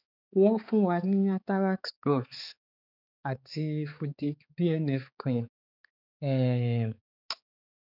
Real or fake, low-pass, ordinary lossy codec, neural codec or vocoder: fake; 5.4 kHz; none; codec, 16 kHz, 4 kbps, X-Codec, HuBERT features, trained on balanced general audio